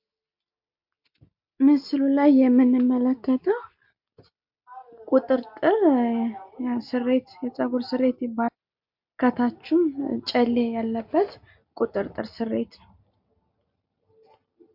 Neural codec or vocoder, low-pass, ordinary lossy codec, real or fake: none; 5.4 kHz; MP3, 48 kbps; real